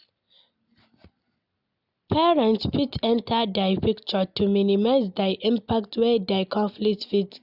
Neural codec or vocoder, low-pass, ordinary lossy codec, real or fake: none; 5.4 kHz; none; real